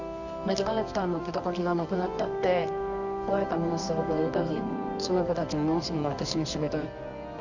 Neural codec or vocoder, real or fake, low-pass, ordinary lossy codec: codec, 24 kHz, 0.9 kbps, WavTokenizer, medium music audio release; fake; 7.2 kHz; none